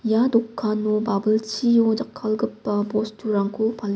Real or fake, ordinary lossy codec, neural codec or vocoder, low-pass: real; none; none; none